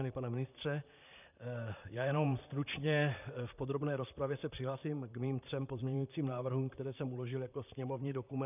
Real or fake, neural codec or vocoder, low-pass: fake; vocoder, 44.1 kHz, 128 mel bands, Pupu-Vocoder; 3.6 kHz